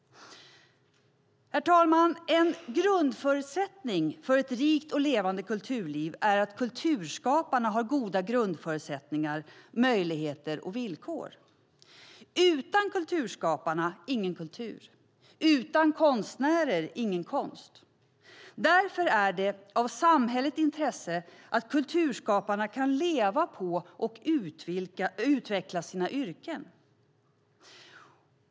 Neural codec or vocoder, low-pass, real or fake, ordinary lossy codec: none; none; real; none